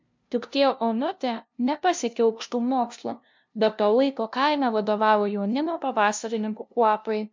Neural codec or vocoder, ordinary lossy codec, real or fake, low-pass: codec, 16 kHz, 0.5 kbps, FunCodec, trained on LibriTTS, 25 frames a second; MP3, 64 kbps; fake; 7.2 kHz